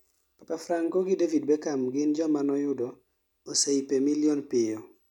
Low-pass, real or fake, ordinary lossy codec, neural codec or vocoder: 19.8 kHz; real; none; none